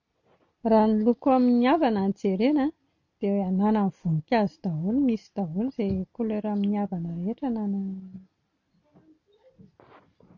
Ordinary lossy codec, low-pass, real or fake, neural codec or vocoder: none; 7.2 kHz; real; none